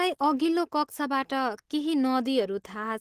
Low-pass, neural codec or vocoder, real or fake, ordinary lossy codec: 14.4 kHz; none; real; Opus, 16 kbps